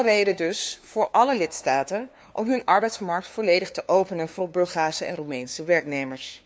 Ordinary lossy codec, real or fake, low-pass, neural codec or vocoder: none; fake; none; codec, 16 kHz, 2 kbps, FunCodec, trained on LibriTTS, 25 frames a second